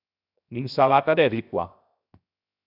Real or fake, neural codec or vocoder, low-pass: fake; codec, 16 kHz, 0.7 kbps, FocalCodec; 5.4 kHz